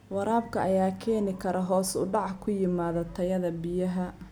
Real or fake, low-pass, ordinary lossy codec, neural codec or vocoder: real; none; none; none